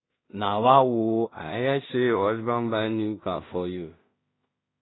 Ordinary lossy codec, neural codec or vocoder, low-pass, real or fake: AAC, 16 kbps; codec, 16 kHz in and 24 kHz out, 0.4 kbps, LongCat-Audio-Codec, two codebook decoder; 7.2 kHz; fake